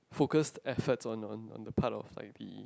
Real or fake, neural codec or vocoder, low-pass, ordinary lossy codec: real; none; none; none